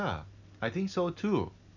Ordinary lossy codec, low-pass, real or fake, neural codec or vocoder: none; 7.2 kHz; real; none